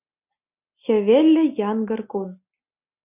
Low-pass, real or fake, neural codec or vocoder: 3.6 kHz; real; none